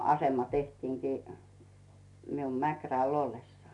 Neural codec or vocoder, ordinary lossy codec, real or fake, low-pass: none; none; real; 10.8 kHz